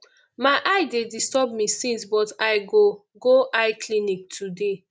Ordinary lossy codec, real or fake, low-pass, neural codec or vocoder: none; real; none; none